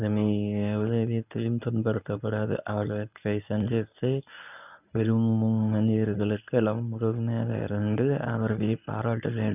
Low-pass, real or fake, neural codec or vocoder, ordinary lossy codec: 3.6 kHz; fake; codec, 24 kHz, 0.9 kbps, WavTokenizer, medium speech release version 1; none